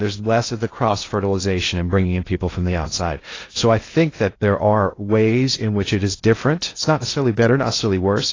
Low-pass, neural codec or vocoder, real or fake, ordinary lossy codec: 7.2 kHz; codec, 16 kHz in and 24 kHz out, 0.6 kbps, FocalCodec, streaming, 2048 codes; fake; AAC, 32 kbps